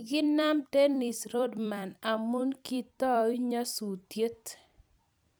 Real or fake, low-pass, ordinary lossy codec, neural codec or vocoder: fake; none; none; vocoder, 44.1 kHz, 128 mel bands every 256 samples, BigVGAN v2